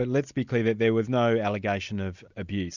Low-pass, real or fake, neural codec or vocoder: 7.2 kHz; real; none